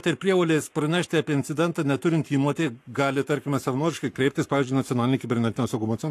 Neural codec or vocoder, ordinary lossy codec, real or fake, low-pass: codec, 44.1 kHz, 7.8 kbps, Pupu-Codec; AAC, 64 kbps; fake; 14.4 kHz